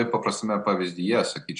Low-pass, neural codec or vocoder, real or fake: 9.9 kHz; none; real